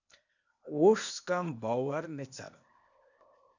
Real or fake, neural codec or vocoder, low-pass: fake; codec, 16 kHz, 0.8 kbps, ZipCodec; 7.2 kHz